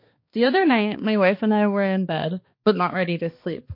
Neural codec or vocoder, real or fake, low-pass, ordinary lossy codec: codec, 16 kHz, 2 kbps, X-Codec, HuBERT features, trained on balanced general audio; fake; 5.4 kHz; MP3, 32 kbps